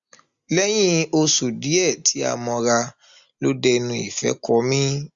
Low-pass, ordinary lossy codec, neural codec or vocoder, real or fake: 7.2 kHz; Opus, 64 kbps; none; real